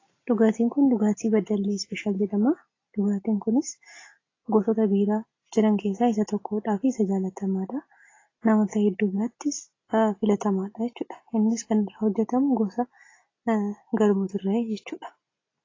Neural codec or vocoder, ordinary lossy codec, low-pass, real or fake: none; AAC, 32 kbps; 7.2 kHz; real